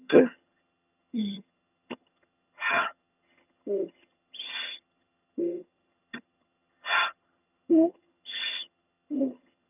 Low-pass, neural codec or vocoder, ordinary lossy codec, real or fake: 3.6 kHz; vocoder, 22.05 kHz, 80 mel bands, HiFi-GAN; none; fake